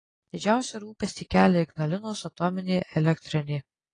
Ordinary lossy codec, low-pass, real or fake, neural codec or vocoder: AAC, 32 kbps; 9.9 kHz; real; none